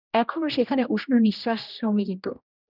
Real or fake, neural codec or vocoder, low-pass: fake; codec, 16 kHz, 1 kbps, X-Codec, HuBERT features, trained on general audio; 5.4 kHz